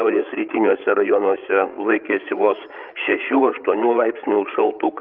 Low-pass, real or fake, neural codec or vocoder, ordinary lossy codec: 5.4 kHz; fake; codec, 16 kHz, 8 kbps, FreqCodec, larger model; Opus, 32 kbps